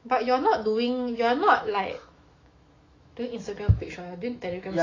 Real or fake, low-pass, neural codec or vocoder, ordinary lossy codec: fake; 7.2 kHz; autoencoder, 48 kHz, 128 numbers a frame, DAC-VAE, trained on Japanese speech; AAC, 32 kbps